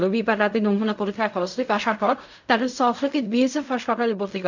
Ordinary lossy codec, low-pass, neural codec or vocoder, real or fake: none; 7.2 kHz; codec, 16 kHz in and 24 kHz out, 0.4 kbps, LongCat-Audio-Codec, fine tuned four codebook decoder; fake